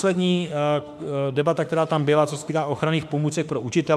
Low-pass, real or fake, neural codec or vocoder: 14.4 kHz; fake; autoencoder, 48 kHz, 32 numbers a frame, DAC-VAE, trained on Japanese speech